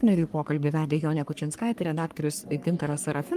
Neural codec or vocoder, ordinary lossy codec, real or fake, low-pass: codec, 44.1 kHz, 3.4 kbps, Pupu-Codec; Opus, 32 kbps; fake; 14.4 kHz